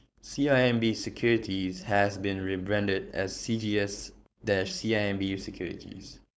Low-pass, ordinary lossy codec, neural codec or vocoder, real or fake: none; none; codec, 16 kHz, 4.8 kbps, FACodec; fake